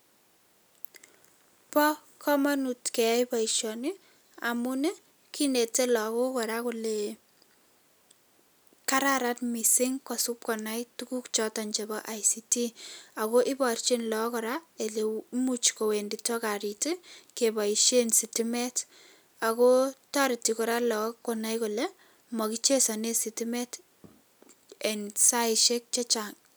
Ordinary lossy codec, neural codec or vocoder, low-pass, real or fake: none; none; none; real